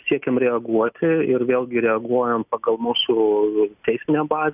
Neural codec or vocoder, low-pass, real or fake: none; 3.6 kHz; real